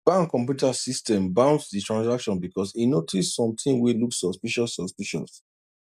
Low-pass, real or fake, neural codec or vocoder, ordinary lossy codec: 14.4 kHz; real; none; none